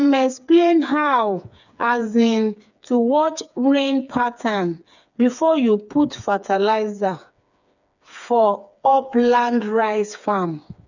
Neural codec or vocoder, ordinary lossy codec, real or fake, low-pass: codec, 16 kHz, 4 kbps, FreqCodec, smaller model; none; fake; 7.2 kHz